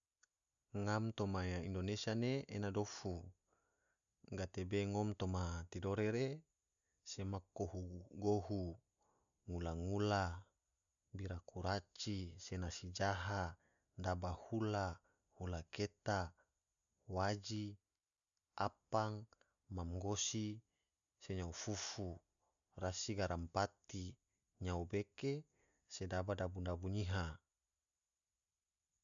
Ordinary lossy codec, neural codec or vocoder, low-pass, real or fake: none; none; 7.2 kHz; real